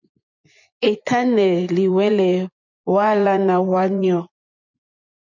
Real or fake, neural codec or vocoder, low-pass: fake; vocoder, 24 kHz, 100 mel bands, Vocos; 7.2 kHz